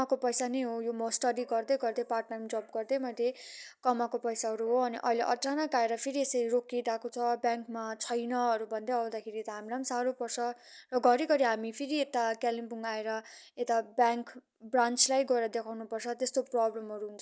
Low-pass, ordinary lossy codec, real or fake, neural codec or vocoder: none; none; real; none